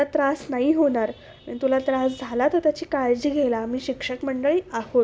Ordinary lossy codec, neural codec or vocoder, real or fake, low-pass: none; none; real; none